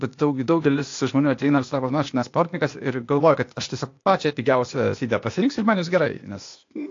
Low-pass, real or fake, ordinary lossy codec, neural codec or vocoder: 7.2 kHz; fake; AAC, 48 kbps; codec, 16 kHz, 0.8 kbps, ZipCodec